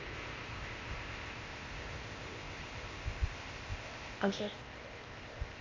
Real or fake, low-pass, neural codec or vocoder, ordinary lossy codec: fake; 7.2 kHz; codec, 16 kHz, 0.8 kbps, ZipCodec; Opus, 32 kbps